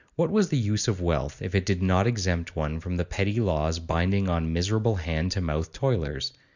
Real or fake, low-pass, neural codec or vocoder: real; 7.2 kHz; none